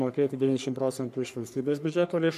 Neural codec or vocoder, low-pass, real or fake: codec, 44.1 kHz, 3.4 kbps, Pupu-Codec; 14.4 kHz; fake